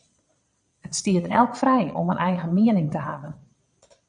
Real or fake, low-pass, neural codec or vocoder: fake; 9.9 kHz; vocoder, 22.05 kHz, 80 mel bands, Vocos